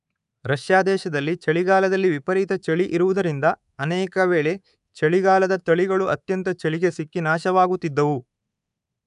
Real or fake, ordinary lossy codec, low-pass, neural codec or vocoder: fake; none; 10.8 kHz; codec, 24 kHz, 3.1 kbps, DualCodec